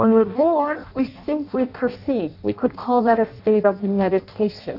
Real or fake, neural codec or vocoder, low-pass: fake; codec, 16 kHz in and 24 kHz out, 0.6 kbps, FireRedTTS-2 codec; 5.4 kHz